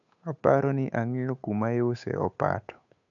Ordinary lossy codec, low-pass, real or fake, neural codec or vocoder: none; 7.2 kHz; fake; codec, 16 kHz, 8 kbps, FunCodec, trained on Chinese and English, 25 frames a second